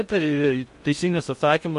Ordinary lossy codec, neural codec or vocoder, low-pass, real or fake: MP3, 48 kbps; codec, 16 kHz in and 24 kHz out, 0.6 kbps, FocalCodec, streaming, 2048 codes; 10.8 kHz; fake